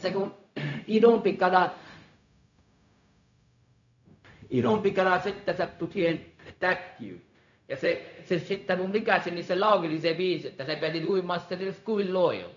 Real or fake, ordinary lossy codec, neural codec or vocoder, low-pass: fake; none; codec, 16 kHz, 0.4 kbps, LongCat-Audio-Codec; 7.2 kHz